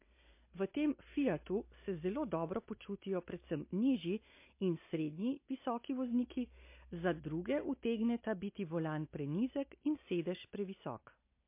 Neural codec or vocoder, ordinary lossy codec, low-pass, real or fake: none; MP3, 24 kbps; 3.6 kHz; real